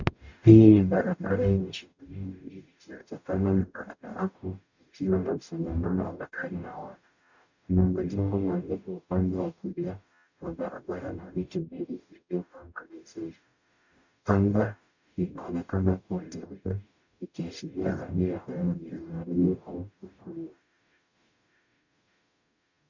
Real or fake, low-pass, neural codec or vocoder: fake; 7.2 kHz; codec, 44.1 kHz, 0.9 kbps, DAC